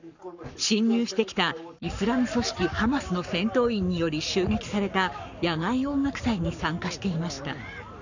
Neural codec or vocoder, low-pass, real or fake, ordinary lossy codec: codec, 44.1 kHz, 7.8 kbps, Pupu-Codec; 7.2 kHz; fake; none